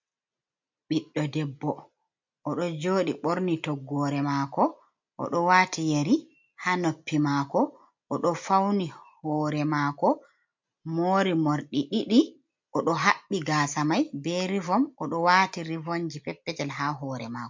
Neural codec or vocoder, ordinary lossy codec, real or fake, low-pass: none; MP3, 48 kbps; real; 7.2 kHz